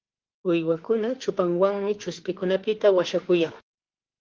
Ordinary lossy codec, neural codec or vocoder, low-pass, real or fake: Opus, 16 kbps; autoencoder, 48 kHz, 32 numbers a frame, DAC-VAE, trained on Japanese speech; 7.2 kHz; fake